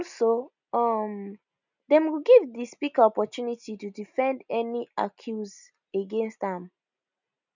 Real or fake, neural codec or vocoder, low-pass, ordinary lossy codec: real; none; 7.2 kHz; none